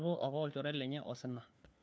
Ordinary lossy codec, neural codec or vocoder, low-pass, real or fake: none; codec, 16 kHz, 4 kbps, FunCodec, trained on LibriTTS, 50 frames a second; none; fake